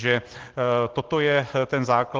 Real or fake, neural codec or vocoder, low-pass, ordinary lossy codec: real; none; 7.2 kHz; Opus, 16 kbps